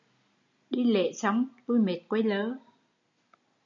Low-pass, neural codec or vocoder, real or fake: 7.2 kHz; none; real